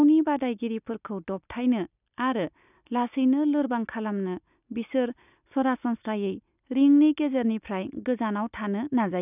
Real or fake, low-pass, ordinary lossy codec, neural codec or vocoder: real; 3.6 kHz; none; none